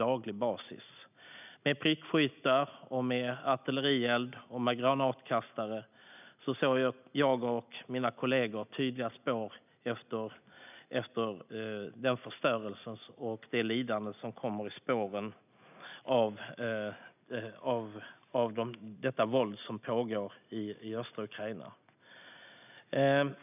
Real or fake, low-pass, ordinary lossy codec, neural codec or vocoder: real; 3.6 kHz; none; none